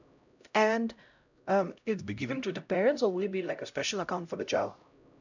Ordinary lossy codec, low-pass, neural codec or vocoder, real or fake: none; 7.2 kHz; codec, 16 kHz, 0.5 kbps, X-Codec, HuBERT features, trained on LibriSpeech; fake